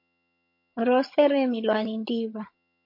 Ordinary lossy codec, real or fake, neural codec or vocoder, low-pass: MP3, 32 kbps; fake; vocoder, 22.05 kHz, 80 mel bands, HiFi-GAN; 5.4 kHz